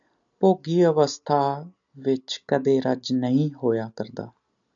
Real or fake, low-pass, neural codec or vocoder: real; 7.2 kHz; none